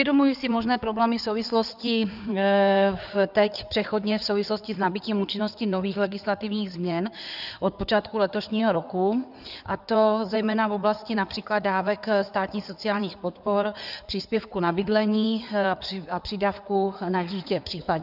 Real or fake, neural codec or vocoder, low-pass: fake; codec, 16 kHz in and 24 kHz out, 2.2 kbps, FireRedTTS-2 codec; 5.4 kHz